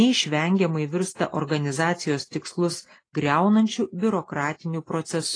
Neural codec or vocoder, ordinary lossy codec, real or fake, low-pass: none; AAC, 32 kbps; real; 9.9 kHz